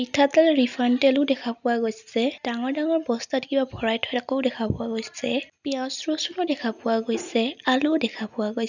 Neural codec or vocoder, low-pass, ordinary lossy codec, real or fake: none; 7.2 kHz; none; real